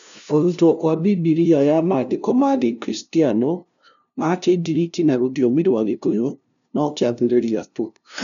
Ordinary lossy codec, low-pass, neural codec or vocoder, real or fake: none; 7.2 kHz; codec, 16 kHz, 0.5 kbps, FunCodec, trained on LibriTTS, 25 frames a second; fake